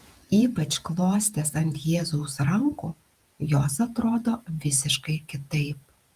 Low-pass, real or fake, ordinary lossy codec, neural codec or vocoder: 14.4 kHz; fake; Opus, 32 kbps; vocoder, 48 kHz, 128 mel bands, Vocos